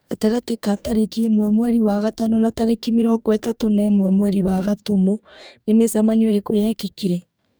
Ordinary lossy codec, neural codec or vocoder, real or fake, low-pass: none; codec, 44.1 kHz, 2.6 kbps, DAC; fake; none